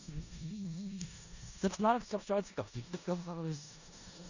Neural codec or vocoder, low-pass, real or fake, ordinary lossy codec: codec, 16 kHz in and 24 kHz out, 0.4 kbps, LongCat-Audio-Codec, four codebook decoder; 7.2 kHz; fake; none